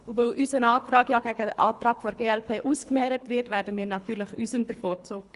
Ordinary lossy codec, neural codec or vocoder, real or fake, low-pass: Opus, 64 kbps; codec, 24 kHz, 3 kbps, HILCodec; fake; 10.8 kHz